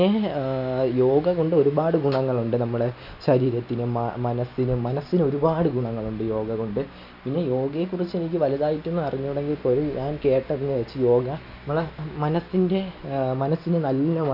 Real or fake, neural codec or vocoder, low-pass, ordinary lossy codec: real; none; 5.4 kHz; none